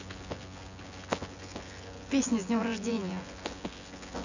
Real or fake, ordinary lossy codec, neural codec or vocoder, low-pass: fake; none; vocoder, 24 kHz, 100 mel bands, Vocos; 7.2 kHz